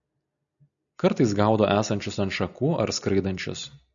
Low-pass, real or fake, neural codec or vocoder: 7.2 kHz; real; none